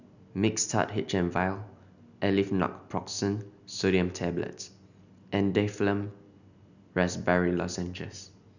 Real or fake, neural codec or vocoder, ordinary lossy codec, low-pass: real; none; none; 7.2 kHz